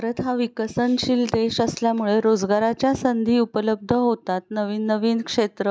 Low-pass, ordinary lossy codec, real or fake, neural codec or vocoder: none; none; real; none